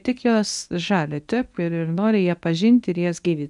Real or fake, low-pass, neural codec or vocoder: fake; 10.8 kHz; codec, 24 kHz, 0.9 kbps, WavTokenizer, medium speech release version 1